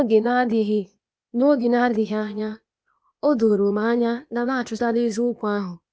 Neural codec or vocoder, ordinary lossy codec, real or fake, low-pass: codec, 16 kHz, 0.8 kbps, ZipCodec; none; fake; none